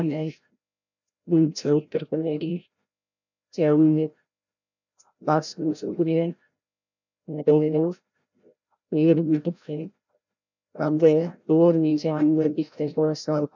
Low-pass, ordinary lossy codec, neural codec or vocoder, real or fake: 7.2 kHz; none; codec, 16 kHz, 0.5 kbps, FreqCodec, larger model; fake